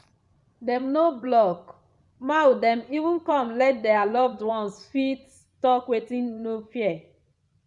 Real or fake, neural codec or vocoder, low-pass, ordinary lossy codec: fake; vocoder, 24 kHz, 100 mel bands, Vocos; 10.8 kHz; none